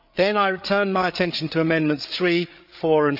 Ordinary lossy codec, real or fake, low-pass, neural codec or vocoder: none; fake; 5.4 kHz; codec, 16 kHz, 8 kbps, FreqCodec, larger model